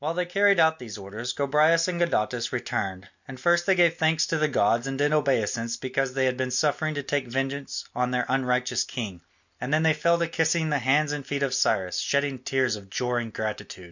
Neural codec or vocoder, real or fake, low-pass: none; real; 7.2 kHz